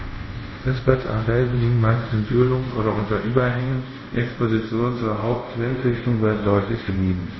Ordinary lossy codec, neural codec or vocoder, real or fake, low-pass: MP3, 24 kbps; codec, 24 kHz, 0.5 kbps, DualCodec; fake; 7.2 kHz